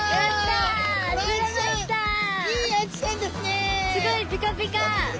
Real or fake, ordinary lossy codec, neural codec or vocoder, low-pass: real; none; none; none